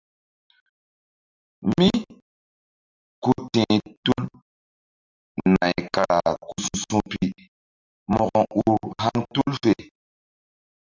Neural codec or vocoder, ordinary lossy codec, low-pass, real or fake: none; Opus, 64 kbps; 7.2 kHz; real